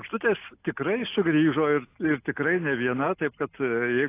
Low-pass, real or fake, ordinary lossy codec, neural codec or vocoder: 3.6 kHz; real; AAC, 24 kbps; none